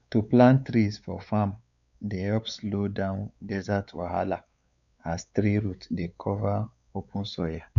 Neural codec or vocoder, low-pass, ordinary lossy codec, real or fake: codec, 16 kHz, 4 kbps, X-Codec, WavLM features, trained on Multilingual LibriSpeech; 7.2 kHz; none; fake